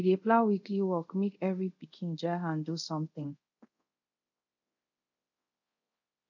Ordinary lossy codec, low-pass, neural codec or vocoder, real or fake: none; 7.2 kHz; codec, 24 kHz, 0.5 kbps, DualCodec; fake